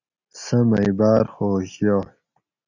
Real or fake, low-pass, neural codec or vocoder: real; 7.2 kHz; none